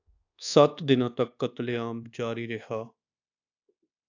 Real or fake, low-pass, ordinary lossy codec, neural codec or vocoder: fake; 7.2 kHz; AAC, 48 kbps; codec, 24 kHz, 1.2 kbps, DualCodec